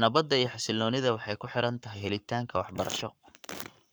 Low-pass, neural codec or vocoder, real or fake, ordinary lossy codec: none; codec, 44.1 kHz, 7.8 kbps, Pupu-Codec; fake; none